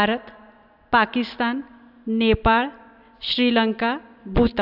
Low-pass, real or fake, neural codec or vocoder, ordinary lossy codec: 5.4 kHz; real; none; AAC, 48 kbps